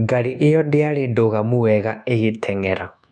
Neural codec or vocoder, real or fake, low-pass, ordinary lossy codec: codec, 24 kHz, 1.2 kbps, DualCodec; fake; none; none